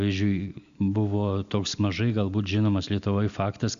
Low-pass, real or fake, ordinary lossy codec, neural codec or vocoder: 7.2 kHz; real; Opus, 64 kbps; none